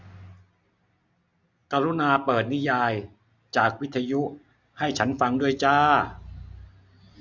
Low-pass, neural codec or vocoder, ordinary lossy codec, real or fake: 7.2 kHz; none; none; real